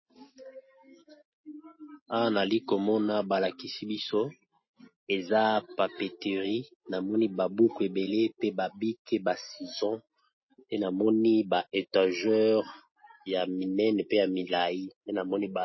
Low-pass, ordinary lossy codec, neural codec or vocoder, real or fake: 7.2 kHz; MP3, 24 kbps; none; real